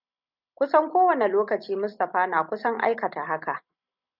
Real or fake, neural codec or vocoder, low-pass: real; none; 5.4 kHz